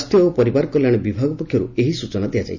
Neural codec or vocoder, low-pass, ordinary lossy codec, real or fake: none; 7.2 kHz; none; real